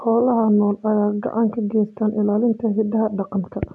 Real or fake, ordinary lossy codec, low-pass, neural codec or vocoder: real; none; none; none